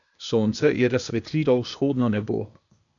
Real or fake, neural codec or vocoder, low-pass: fake; codec, 16 kHz, 0.8 kbps, ZipCodec; 7.2 kHz